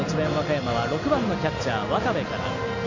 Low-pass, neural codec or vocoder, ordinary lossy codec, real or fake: 7.2 kHz; none; none; real